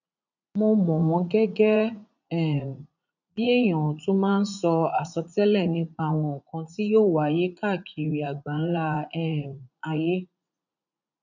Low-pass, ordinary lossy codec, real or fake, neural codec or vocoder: 7.2 kHz; none; fake; vocoder, 44.1 kHz, 80 mel bands, Vocos